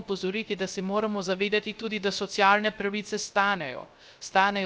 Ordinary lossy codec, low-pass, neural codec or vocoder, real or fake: none; none; codec, 16 kHz, 0.3 kbps, FocalCodec; fake